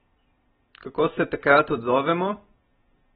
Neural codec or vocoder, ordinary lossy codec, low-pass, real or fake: none; AAC, 16 kbps; 19.8 kHz; real